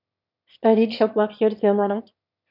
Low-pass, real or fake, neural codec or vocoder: 5.4 kHz; fake; autoencoder, 22.05 kHz, a latent of 192 numbers a frame, VITS, trained on one speaker